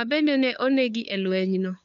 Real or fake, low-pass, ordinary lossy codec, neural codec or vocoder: fake; 7.2 kHz; none; codec, 16 kHz, 2 kbps, FunCodec, trained on LibriTTS, 25 frames a second